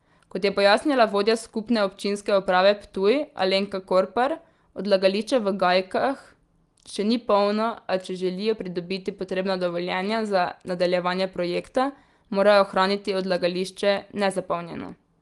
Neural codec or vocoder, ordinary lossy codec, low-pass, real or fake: none; Opus, 24 kbps; 10.8 kHz; real